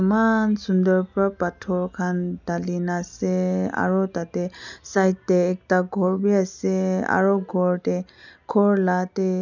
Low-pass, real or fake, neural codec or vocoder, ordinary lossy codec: 7.2 kHz; real; none; none